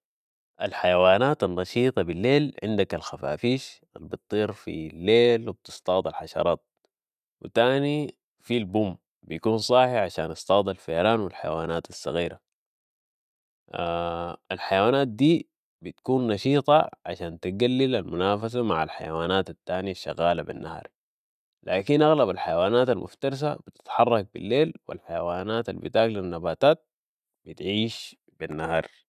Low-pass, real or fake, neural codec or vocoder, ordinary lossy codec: 14.4 kHz; real; none; none